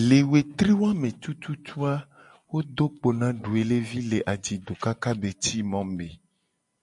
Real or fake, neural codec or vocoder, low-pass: real; none; 10.8 kHz